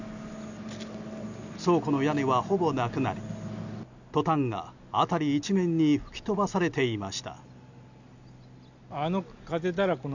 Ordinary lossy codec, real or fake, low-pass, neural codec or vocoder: none; real; 7.2 kHz; none